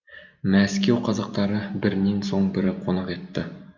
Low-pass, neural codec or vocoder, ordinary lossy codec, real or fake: 7.2 kHz; none; Opus, 64 kbps; real